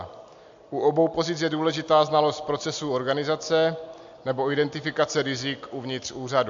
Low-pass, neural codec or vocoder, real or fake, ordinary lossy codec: 7.2 kHz; none; real; AAC, 64 kbps